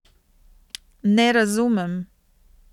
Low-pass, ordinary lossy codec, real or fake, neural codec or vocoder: 19.8 kHz; none; real; none